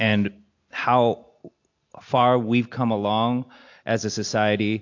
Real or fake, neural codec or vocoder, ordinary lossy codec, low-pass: real; none; AAC, 48 kbps; 7.2 kHz